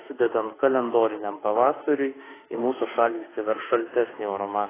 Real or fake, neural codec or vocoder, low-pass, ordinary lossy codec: fake; autoencoder, 48 kHz, 32 numbers a frame, DAC-VAE, trained on Japanese speech; 3.6 kHz; AAC, 16 kbps